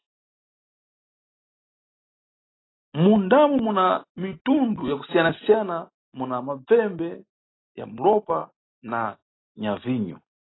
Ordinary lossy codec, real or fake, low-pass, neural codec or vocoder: AAC, 16 kbps; real; 7.2 kHz; none